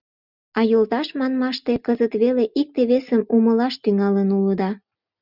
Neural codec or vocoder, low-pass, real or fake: none; 5.4 kHz; real